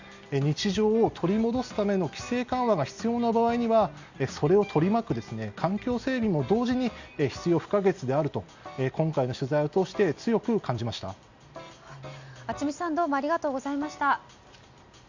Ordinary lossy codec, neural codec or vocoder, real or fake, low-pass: Opus, 64 kbps; none; real; 7.2 kHz